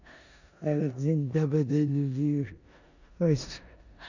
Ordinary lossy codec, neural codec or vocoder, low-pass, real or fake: none; codec, 16 kHz in and 24 kHz out, 0.9 kbps, LongCat-Audio-Codec, four codebook decoder; 7.2 kHz; fake